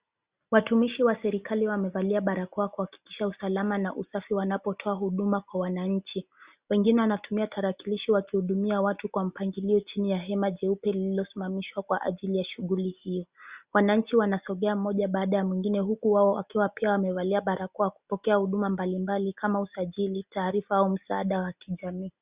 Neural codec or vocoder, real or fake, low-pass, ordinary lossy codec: none; real; 3.6 kHz; Opus, 64 kbps